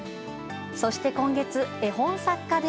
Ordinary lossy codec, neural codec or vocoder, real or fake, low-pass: none; none; real; none